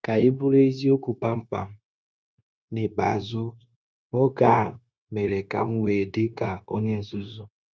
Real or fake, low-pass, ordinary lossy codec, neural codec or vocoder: fake; none; none; codec, 16 kHz, 0.9 kbps, LongCat-Audio-Codec